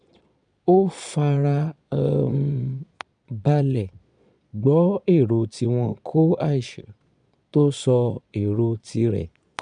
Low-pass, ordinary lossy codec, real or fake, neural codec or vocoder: 9.9 kHz; none; fake; vocoder, 22.05 kHz, 80 mel bands, Vocos